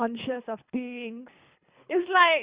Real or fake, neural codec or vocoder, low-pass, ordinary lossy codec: fake; codec, 24 kHz, 3 kbps, HILCodec; 3.6 kHz; Opus, 64 kbps